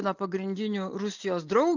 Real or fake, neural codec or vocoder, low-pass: real; none; 7.2 kHz